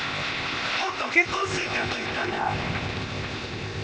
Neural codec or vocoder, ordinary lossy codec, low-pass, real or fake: codec, 16 kHz, 0.8 kbps, ZipCodec; none; none; fake